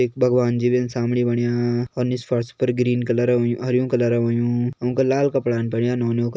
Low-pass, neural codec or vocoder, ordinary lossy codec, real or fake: none; none; none; real